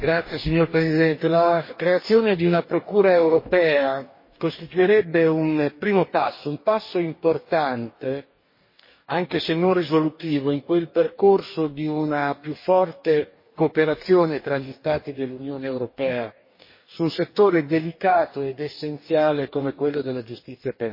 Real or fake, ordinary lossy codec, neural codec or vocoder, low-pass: fake; MP3, 24 kbps; codec, 44.1 kHz, 2.6 kbps, DAC; 5.4 kHz